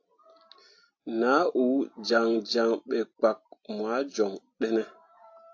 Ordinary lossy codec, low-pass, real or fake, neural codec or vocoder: AAC, 48 kbps; 7.2 kHz; real; none